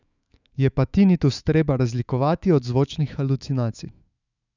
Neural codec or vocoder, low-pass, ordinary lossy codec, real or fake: autoencoder, 48 kHz, 128 numbers a frame, DAC-VAE, trained on Japanese speech; 7.2 kHz; none; fake